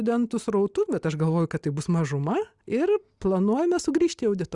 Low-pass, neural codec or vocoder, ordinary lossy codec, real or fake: 10.8 kHz; none; Opus, 64 kbps; real